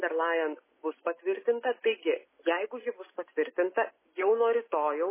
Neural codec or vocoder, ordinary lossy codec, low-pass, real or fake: none; MP3, 16 kbps; 3.6 kHz; real